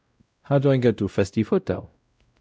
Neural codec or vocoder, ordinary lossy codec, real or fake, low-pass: codec, 16 kHz, 0.5 kbps, X-Codec, WavLM features, trained on Multilingual LibriSpeech; none; fake; none